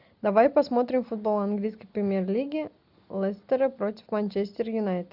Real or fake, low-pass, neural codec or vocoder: real; 5.4 kHz; none